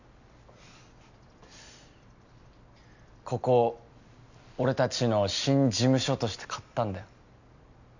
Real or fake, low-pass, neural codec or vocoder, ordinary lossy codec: real; 7.2 kHz; none; none